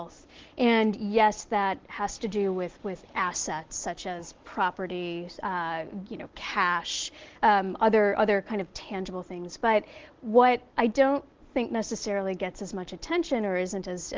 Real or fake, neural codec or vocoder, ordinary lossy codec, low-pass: real; none; Opus, 16 kbps; 7.2 kHz